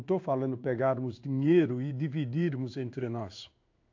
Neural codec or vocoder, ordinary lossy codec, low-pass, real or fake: codec, 16 kHz in and 24 kHz out, 1 kbps, XY-Tokenizer; AAC, 48 kbps; 7.2 kHz; fake